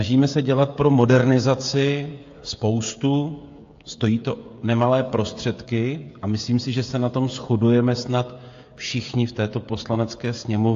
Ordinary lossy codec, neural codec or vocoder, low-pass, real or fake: AAC, 48 kbps; codec, 16 kHz, 16 kbps, FreqCodec, smaller model; 7.2 kHz; fake